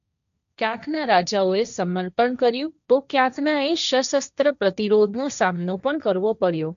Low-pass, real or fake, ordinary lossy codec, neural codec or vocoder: 7.2 kHz; fake; AAC, 96 kbps; codec, 16 kHz, 1.1 kbps, Voila-Tokenizer